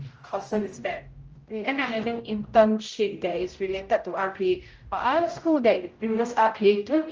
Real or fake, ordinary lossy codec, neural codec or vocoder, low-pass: fake; Opus, 24 kbps; codec, 16 kHz, 0.5 kbps, X-Codec, HuBERT features, trained on general audio; 7.2 kHz